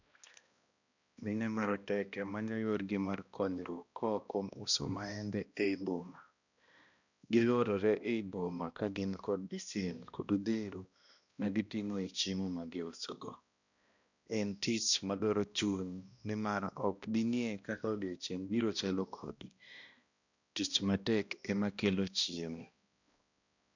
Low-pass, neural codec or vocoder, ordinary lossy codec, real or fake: 7.2 kHz; codec, 16 kHz, 1 kbps, X-Codec, HuBERT features, trained on balanced general audio; none; fake